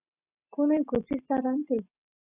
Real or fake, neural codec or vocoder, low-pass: real; none; 3.6 kHz